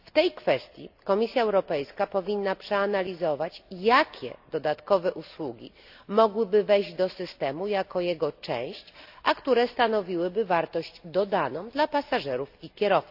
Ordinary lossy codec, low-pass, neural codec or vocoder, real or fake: AAC, 48 kbps; 5.4 kHz; none; real